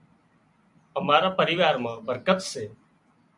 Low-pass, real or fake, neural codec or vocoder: 10.8 kHz; real; none